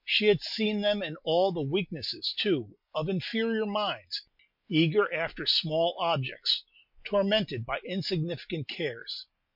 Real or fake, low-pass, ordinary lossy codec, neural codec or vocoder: real; 5.4 kHz; MP3, 48 kbps; none